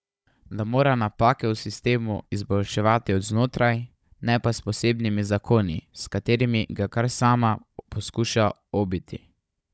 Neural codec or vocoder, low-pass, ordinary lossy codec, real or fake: codec, 16 kHz, 16 kbps, FunCodec, trained on Chinese and English, 50 frames a second; none; none; fake